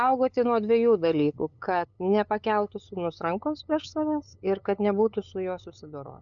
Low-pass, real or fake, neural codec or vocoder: 7.2 kHz; fake; codec, 16 kHz, 8 kbps, FunCodec, trained on LibriTTS, 25 frames a second